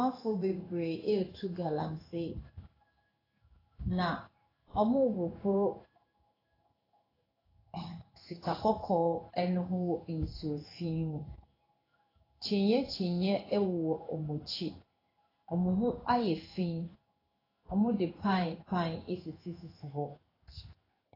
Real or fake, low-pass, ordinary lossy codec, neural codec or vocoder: fake; 5.4 kHz; AAC, 24 kbps; codec, 16 kHz in and 24 kHz out, 1 kbps, XY-Tokenizer